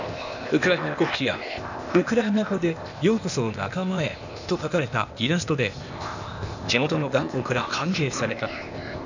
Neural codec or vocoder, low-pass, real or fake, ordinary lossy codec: codec, 16 kHz, 0.8 kbps, ZipCodec; 7.2 kHz; fake; none